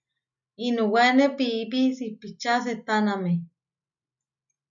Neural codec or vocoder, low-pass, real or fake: none; 7.2 kHz; real